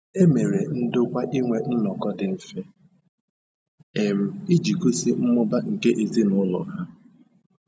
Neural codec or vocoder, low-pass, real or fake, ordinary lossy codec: none; none; real; none